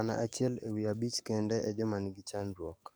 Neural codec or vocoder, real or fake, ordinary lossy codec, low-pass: codec, 44.1 kHz, 7.8 kbps, DAC; fake; none; none